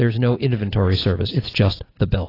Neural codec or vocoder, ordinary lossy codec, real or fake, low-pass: none; AAC, 24 kbps; real; 5.4 kHz